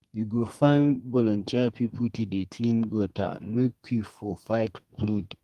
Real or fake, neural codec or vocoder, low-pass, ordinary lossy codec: fake; codec, 32 kHz, 1.9 kbps, SNAC; 14.4 kHz; Opus, 32 kbps